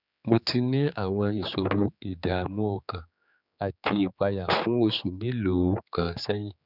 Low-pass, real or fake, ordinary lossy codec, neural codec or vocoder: 5.4 kHz; fake; none; codec, 16 kHz, 4 kbps, X-Codec, HuBERT features, trained on general audio